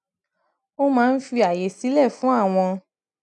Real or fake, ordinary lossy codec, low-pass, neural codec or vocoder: real; none; 10.8 kHz; none